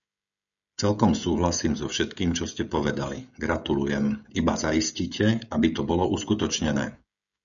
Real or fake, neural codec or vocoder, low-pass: fake; codec, 16 kHz, 16 kbps, FreqCodec, smaller model; 7.2 kHz